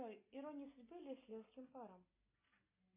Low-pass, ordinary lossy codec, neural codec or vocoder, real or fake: 3.6 kHz; MP3, 24 kbps; none; real